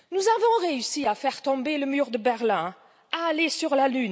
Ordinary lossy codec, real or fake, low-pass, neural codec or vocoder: none; real; none; none